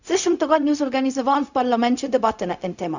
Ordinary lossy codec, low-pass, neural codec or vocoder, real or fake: none; 7.2 kHz; codec, 16 kHz, 0.4 kbps, LongCat-Audio-Codec; fake